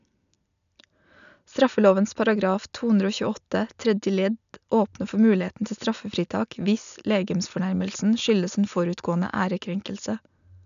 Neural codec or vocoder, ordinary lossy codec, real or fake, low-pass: none; none; real; 7.2 kHz